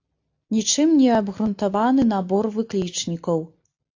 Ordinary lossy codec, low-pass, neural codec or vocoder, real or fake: AAC, 48 kbps; 7.2 kHz; none; real